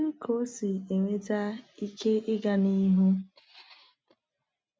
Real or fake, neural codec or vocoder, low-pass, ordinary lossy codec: real; none; none; none